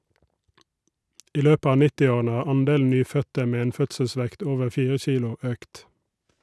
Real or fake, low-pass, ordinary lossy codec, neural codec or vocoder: real; none; none; none